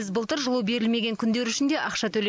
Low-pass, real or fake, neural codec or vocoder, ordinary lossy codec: none; real; none; none